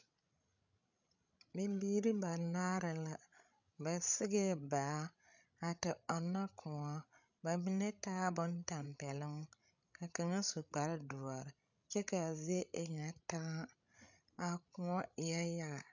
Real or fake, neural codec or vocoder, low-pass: fake; codec, 16 kHz, 16 kbps, FreqCodec, larger model; 7.2 kHz